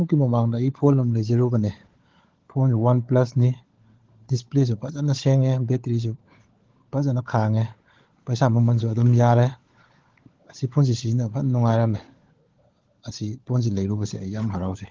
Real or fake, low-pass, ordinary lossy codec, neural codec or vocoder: fake; 7.2 kHz; Opus, 16 kbps; codec, 16 kHz, 16 kbps, FunCodec, trained on LibriTTS, 50 frames a second